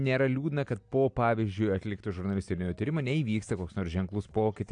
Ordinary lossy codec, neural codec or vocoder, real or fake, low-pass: AAC, 64 kbps; none; real; 9.9 kHz